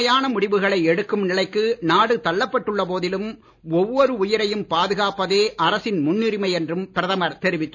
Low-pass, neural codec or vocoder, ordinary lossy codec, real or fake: none; none; none; real